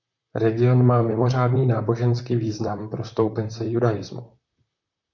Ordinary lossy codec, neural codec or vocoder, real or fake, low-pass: MP3, 48 kbps; vocoder, 44.1 kHz, 128 mel bands, Pupu-Vocoder; fake; 7.2 kHz